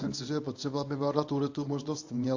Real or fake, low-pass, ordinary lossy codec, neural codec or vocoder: fake; 7.2 kHz; AAC, 48 kbps; codec, 24 kHz, 0.9 kbps, WavTokenizer, medium speech release version 1